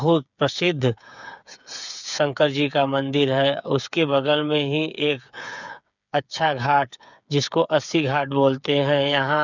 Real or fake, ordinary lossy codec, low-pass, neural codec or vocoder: fake; none; 7.2 kHz; codec, 16 kHz, 8 kbps, FreqCodec, smaller model